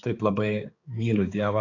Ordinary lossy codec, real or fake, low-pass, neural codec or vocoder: AAC, 32 kbps; fake; 7.2 kHz; codec, 16 kHz, 4 kbps, X-Codec, HuBERT features, trained on balanced general audio